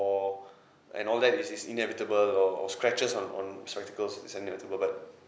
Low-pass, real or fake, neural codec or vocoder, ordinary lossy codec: none; real; none; none